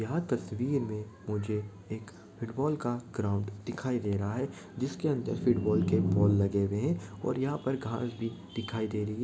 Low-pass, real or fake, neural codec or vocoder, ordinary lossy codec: none; real; none; none